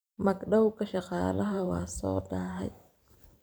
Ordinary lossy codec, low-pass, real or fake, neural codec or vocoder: none; none; fake; vocoder, 44.1 kHz, 128 mel bands every 512 samples, BigVGAN v2